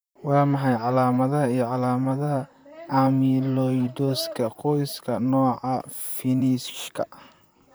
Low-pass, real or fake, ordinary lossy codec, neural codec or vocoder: none; fake; none; vocoder, 44.1 kHz, 128 mel bands, Pupu-Vocoder